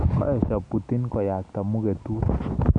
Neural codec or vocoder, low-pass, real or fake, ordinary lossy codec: none; 9.9 kHz; real; none